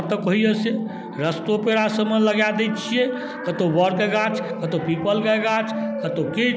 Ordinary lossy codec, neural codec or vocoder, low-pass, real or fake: none; none; none; real